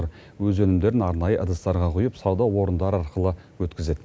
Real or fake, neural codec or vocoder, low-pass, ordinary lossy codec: real; none; none; none